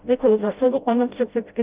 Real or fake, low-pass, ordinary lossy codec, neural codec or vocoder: fake; 3.6 kHz; Opus, 24 kbps; codec, 16 kHz, 0.5 kbps, FreqCodec, smaller model